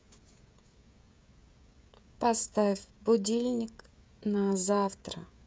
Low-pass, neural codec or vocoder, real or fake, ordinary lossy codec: none; codec, 16 kHz, 16 kbps, FreqCodec, smaller model; fake; none